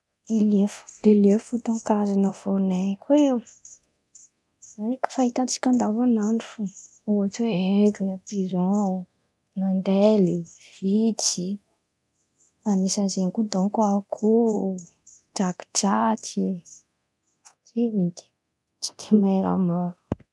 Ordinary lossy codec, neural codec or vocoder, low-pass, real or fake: none; codec, 24 kHz, 0.9 kbps, DualCodec; none; fake